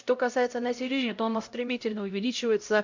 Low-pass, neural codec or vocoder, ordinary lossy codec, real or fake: 7.2 kHz; codec, 16 kHz, 0.5 kbps, X-Codec, HuBERT features, trained on LibriSpeech; MP3, 64 kbps; fake